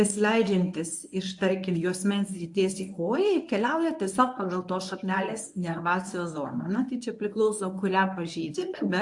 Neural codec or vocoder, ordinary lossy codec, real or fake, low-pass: codec, 24 kHz, 0.9 kbps, WavTokenizer, medium speech release version 1; AAC, 64 kbps; fake; 10.8 kHz